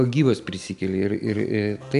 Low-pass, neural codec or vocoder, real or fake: 10.8 kHz; none; real